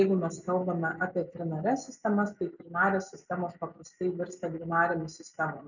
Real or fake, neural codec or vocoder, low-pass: real; none; 7.2 kHz